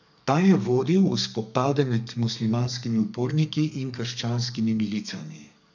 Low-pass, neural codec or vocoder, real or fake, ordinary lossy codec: 7.2 kHz; codec, 32 kHz, 1.9 kbps, SNAC; fake; none